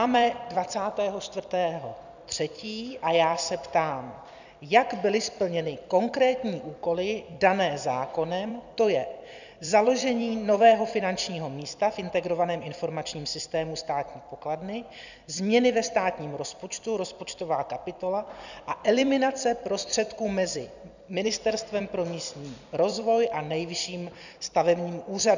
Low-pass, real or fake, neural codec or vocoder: 7.2 kHz; fake; vocoder, 44.1 kHz, 128 mel bands every 256 samples, BigVGAN v2